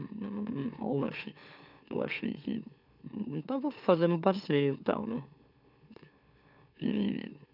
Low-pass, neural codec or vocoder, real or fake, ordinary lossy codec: 5.4 kHz; autoencoder, 44.1 kHz, a latent of 192 numbers a frame, MeloTTS; fake; none